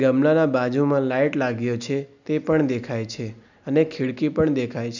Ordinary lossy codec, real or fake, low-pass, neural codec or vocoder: none; real; 7.2 kHz; none